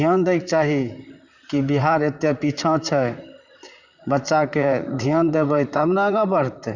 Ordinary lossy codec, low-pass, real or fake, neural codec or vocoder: none; 7.2 kHz; fake; vocoder, 44.1 kHz, 128 mel bands, Pupu-Vocoder